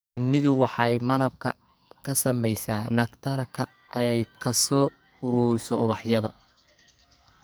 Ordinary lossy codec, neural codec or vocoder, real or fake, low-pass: none; codec, 44.1 kHz, 2.6 kbps, SNAC; fake; none